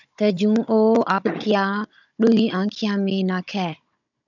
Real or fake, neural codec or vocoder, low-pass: fake; codec, 16 kHz, 16 kbps, FunCodec, trained on Chinese and English, 50 frames a second; 7.2 kHz